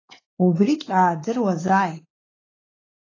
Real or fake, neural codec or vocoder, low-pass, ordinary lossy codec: fake; codec, 16 kHz, 4 kbps, X-Codec, WavLM features, trained on Multilingual LibriSpeech; 7.2 kHz; AAC, 32 kbps